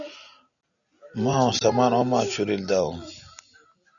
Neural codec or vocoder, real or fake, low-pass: none; real; 7.2 kHz